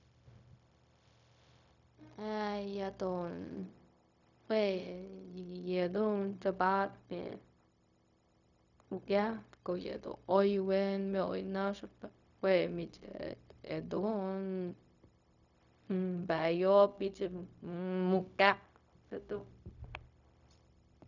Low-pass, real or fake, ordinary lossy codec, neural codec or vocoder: 7.2 kHz; fake; none; codec, 16 kHz, 0.4 kbps, LongCat-Audio-Codec